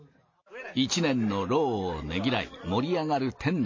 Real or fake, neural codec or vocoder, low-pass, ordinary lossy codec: real; none; 7.2 kHz; MP3, 32 kbps